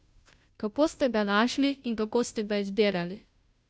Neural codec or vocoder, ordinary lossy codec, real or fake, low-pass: codec, 16 kHz, 0.5 kbps, FunCodec, trained on Chinese and English, 25 frames a second; none; fake; none